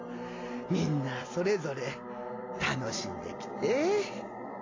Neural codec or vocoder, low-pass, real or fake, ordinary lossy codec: none; 7.2 kHz; real; AAC, 32 kbps